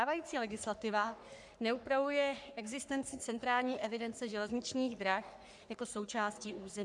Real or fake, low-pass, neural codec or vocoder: fake; 10.8 kHz; codec, 44.1 kHz, 3.4 kbps, Pupu-Codec